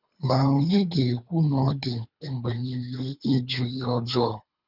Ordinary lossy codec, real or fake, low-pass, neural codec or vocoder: none; fake; 5.4 kHz; codec, 24 kHz, 3 kbps, HILCodec